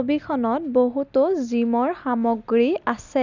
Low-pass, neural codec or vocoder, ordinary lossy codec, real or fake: 7.2 kHz; none; none; real